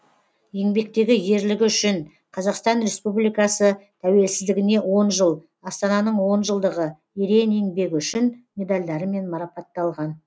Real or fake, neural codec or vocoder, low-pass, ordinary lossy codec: real; none; none; none